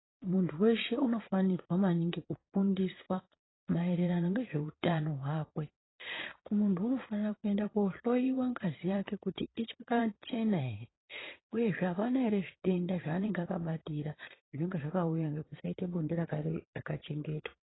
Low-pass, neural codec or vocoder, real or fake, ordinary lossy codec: 7.2 kHz; vocoder, 22.05 kHz, 80 mel bands, Vocos; fake; AAC, 16 kbps